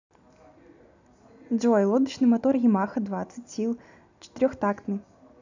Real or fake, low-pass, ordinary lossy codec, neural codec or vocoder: real; 7.2 kHz; none; none